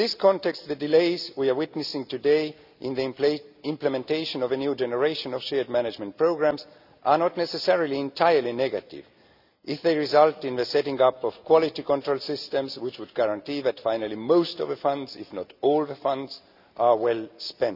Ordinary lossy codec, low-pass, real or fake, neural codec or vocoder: none; 5.4 kHz; real; none